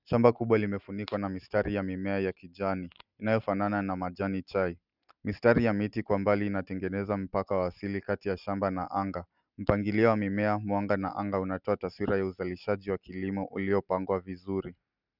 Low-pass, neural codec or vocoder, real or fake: 5.4 kHz; none; real